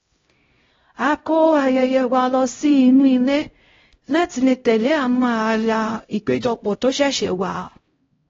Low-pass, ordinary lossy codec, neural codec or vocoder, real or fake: 7.2 kHz; AAC, 24 kbps; codec, 16 kHz, 0.5 kbps, X-Codec, HuBERT features, trained on LibriSpeech; fake